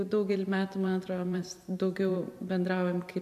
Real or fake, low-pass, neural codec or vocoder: fake; 14.4 kHz; vocoder, 44.1 kHz, 128 mel bands every 512 samples, BigVGAN v2